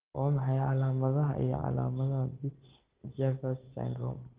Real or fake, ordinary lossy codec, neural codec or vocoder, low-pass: real; Opus, 16 kbps; none; 3.6 kHz